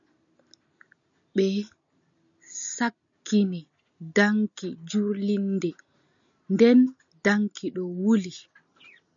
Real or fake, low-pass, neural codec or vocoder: real; 7.2 kHz; none